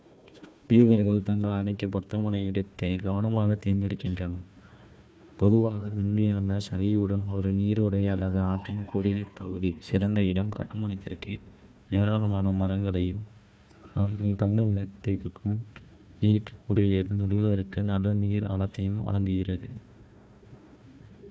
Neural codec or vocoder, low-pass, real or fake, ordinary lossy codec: codec, 16 kHz, 1 kbps, FunCodec, trained on Chinese and English, 50 frames a second; none; fake; none